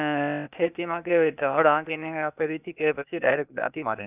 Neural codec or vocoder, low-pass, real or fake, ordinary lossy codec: codec, 16 kHz, 0.8 kbps, ZipCodec; 3.6 kHz; fake; none